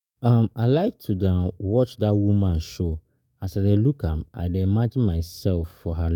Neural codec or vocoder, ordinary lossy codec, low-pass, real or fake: codec, 44.1 kHz, 7.8 kbps, DAC; none; 19.8 kHz; fake